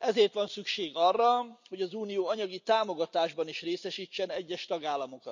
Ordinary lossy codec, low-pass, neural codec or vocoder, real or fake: none; 7.2 kHz; none; real